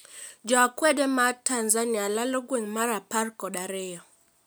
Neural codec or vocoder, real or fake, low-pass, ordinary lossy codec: none; real; none; none